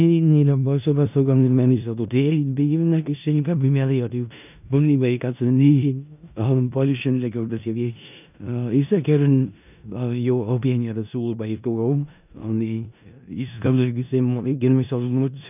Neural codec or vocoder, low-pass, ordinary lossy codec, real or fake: codec, 16 kHz in and 24 kHz out, 0.4 kbps, LongCat-Audio-Codec, four codebook decoder; 3.6 kHz; none; fake